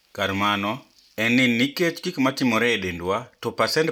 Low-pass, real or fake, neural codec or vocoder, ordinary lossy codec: 19.8 kHz; real; none; none